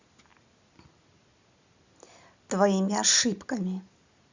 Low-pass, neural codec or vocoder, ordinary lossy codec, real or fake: 7.2 kHz; none; Opus, 64 kbps; real